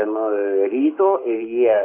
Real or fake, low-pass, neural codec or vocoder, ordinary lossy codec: fake; 3.6 kHz; codec, 44.1 kHz, 7.8 kbps, Pupu-Codec; AAC, 24 kbps